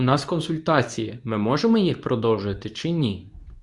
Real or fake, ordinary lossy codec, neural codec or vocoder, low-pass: fake; Opus, 64 kbps; codec, 24 kHz, 0.9 kbps, WavTokenizer, medium speech release version 2; 10.8 kHz